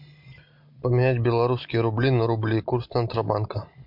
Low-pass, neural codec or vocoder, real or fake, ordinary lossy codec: 5.4 kHz; none; real; MP3, 48 kbps